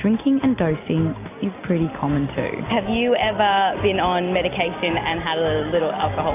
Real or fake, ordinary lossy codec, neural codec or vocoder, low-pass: real; AAC, 24 kbps; none; 3.6 kHz